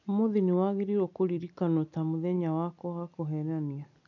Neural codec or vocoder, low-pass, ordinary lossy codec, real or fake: none; 7.2 kHz; none; real